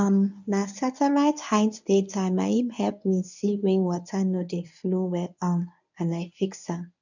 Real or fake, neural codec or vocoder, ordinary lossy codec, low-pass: fake; codec, 24 kHz, 0.9 kbps, WavTokenizer, medium speech release version 1; none; 7.2 kHz